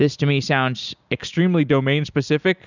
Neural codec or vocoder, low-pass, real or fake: none; 7.2 kHz; real